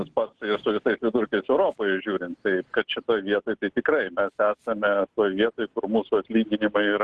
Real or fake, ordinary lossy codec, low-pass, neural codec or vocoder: real; Opus, 16 kbps; 10.8 kHz; none